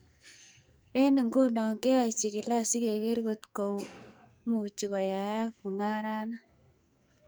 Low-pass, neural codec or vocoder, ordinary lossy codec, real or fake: none; codec, 44.1 kHz, 2.6 kbps, SNAC; none; fake